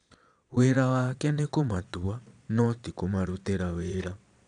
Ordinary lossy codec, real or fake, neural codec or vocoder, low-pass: none; fake; vocoder, 22.05 kHz, 80 mel bands, Vocos; 9.9 kHz